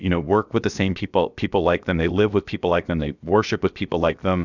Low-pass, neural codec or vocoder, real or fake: 7.2 kHz; codec, 16 kHz, about 1 kbps, DyCAST, with the encoder's durations; fake